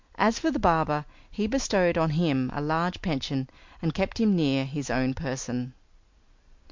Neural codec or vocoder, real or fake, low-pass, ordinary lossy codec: none; real; 7.2 kHz; MP3, 64 kbps